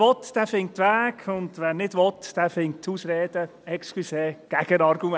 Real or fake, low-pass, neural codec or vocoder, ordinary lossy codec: real; none; none; none